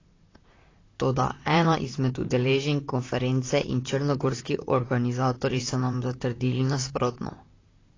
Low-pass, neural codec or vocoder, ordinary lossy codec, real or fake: 7.2 kHz; vocoder, 44.1 kHz, 128 mel bands, Pupu-Vocoder; AAC, 32 kbps; fake